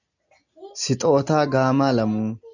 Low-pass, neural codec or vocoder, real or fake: 7.2 kHz; none; real